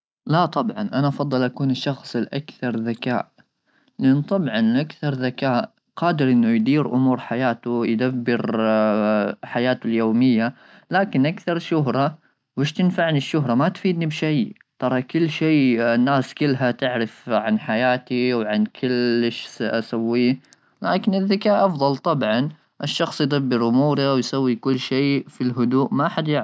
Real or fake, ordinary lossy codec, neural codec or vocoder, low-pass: real; none; none; none